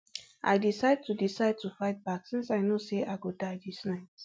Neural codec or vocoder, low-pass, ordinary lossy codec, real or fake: none; none; none; real